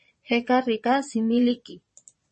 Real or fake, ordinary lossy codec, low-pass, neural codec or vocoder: fake; MP3, 32 kbps; 9.9 kHz; vocoder, 22.05 kHz, 80 mel bands, Vocos